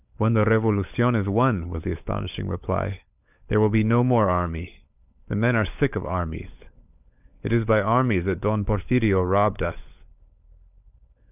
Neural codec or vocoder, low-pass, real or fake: codec, 16 kHz, 16 kbps, FunCodec, trained on LibriTTS, 50 frames a second; 3.6 kHz; fake